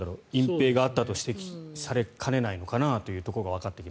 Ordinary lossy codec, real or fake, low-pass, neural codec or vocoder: none; real; none; none